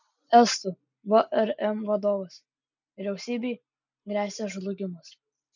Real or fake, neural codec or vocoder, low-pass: real; none; 7.2 kHz